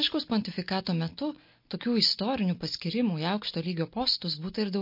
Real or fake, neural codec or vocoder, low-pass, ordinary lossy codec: real; none; 5.4 kHz; MP3, 32 kbps